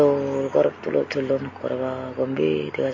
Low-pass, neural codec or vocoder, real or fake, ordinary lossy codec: 7.2 kHz; none; real; MP3, 32 kbps